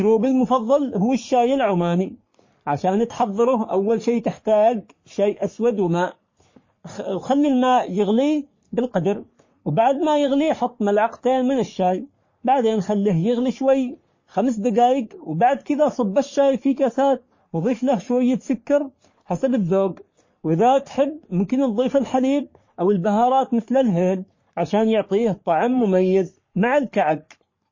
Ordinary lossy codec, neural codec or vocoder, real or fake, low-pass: MP3, 32 kbps; codec, 44.1 kHz, 3.4 kbps, Pupu-Codec; fake; 7.2 kHz